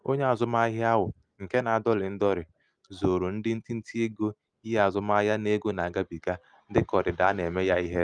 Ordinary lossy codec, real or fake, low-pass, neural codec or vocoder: Opus, 32 kbps; real; 9.9 kHz; none